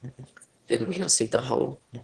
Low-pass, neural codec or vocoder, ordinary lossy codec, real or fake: 9.9 kHz; autoencoder, 22.05 kHz, a latent of 192 numbers a frame, VITS, trained on one speaker; Opus, 16 kbps; fake